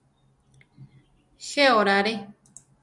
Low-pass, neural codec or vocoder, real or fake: 10.8 kHz; none; real